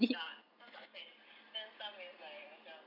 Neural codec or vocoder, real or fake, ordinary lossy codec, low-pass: none; real; none; 5.4 kHz